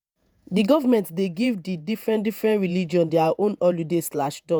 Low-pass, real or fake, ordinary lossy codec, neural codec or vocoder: none; fake; none; vocoder, 48 kHz, 128 mel bands, Vocos